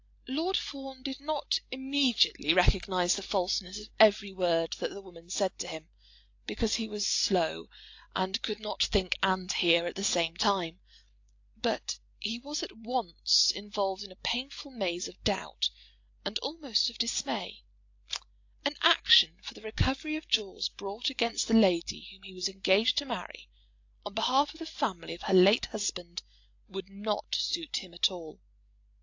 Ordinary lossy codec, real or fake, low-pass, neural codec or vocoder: AAC, 48 kbps; real; 7.2 kHz; none